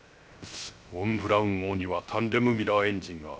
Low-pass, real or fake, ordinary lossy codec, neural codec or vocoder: none; fake; none; codec, 16 kHz, 0.3 kbps, FocalCodec